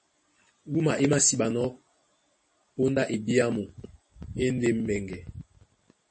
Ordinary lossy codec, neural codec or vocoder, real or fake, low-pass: MP3, 32 kbps; none; real; 9.9 kHz